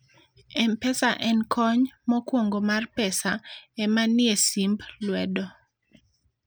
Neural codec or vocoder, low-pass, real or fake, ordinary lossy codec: none; none; real; none